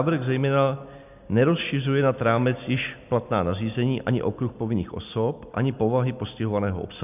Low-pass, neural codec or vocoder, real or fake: 3.6 kHz; none; real